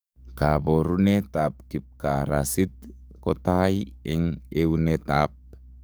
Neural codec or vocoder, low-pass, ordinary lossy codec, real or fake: codec, 44.1 kHz, 7.8 kbps, DAC; none; none; fake